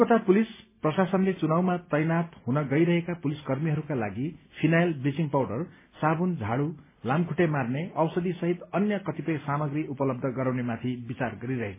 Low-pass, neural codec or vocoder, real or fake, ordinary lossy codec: 3.6 kHz; none; real; MP3, 16 kbps